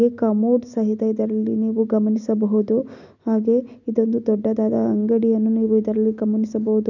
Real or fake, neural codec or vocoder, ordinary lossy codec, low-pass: real; none; none; 7.2 kHz